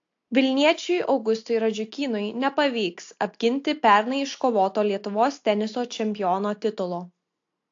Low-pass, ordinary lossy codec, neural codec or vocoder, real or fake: 7.2 kHz; AAC, 48 kbps; none; real